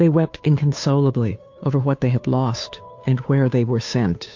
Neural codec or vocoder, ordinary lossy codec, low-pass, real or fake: codec, 16 kHz, 2 kbps, FunCodec, trained on Chinese and English, 25 frames a second; MP3, 48 kbps; 7.2 kHz; fake